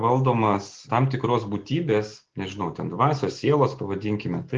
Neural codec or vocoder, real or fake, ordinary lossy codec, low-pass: none; real; Opus, 16 kbps; 7.2 kHz